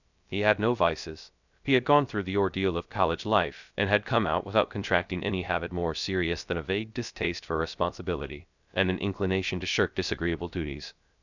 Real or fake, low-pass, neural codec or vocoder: fake; 7.2 kHz; codec, 16 kHz, 0.3 kbps, FocalCodec